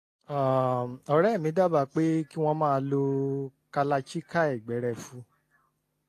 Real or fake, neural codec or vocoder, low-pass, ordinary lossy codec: real; none; 14.4 kHz; AAC, 48 kbps